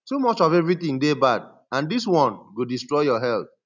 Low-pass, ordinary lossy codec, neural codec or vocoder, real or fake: 7.2 kHz; none; none; real